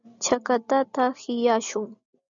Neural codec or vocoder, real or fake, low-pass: none; real; 7.2 kHz